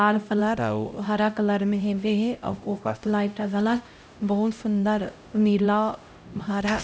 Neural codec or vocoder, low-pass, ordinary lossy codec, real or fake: codec, 16 kHz, 0.5 kbps, X-Codec, HuBERT features, trained on LibriSpeech; none; none; fake